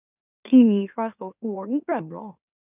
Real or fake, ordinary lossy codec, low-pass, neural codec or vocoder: fake; none; 3.6 kHz; autoencoder, 44.1 kHz, a latent of 192 numbers a frame, MeloTTS